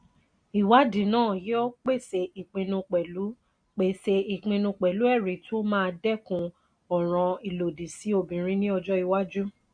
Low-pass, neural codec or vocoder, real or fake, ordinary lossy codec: 9.9 kHz; none; real; none